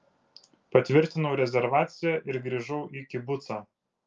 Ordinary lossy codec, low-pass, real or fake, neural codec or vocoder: Opus, 24 kbps; 7.2 kHz; real; none